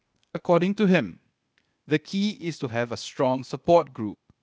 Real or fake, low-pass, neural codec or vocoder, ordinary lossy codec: fake; none; codec, 16 kHz, 0.8 kbps, ZipCodec; none